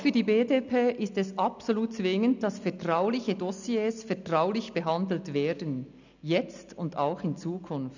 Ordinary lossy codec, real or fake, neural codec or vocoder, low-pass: none; real; none; 7.2 kHz